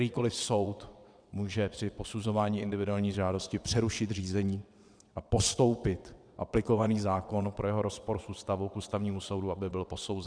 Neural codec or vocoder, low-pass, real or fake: vocoder, 22.05 kHz, 80 mel bands, WaveNeXt; 9.9 kHz; fake